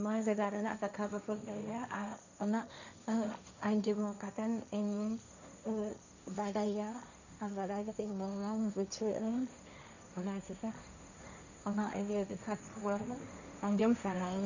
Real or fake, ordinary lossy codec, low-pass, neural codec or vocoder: fake; none; 7.2 kHz; codec, 16 kHz, 1.1 kbps, Voila-Tokenizer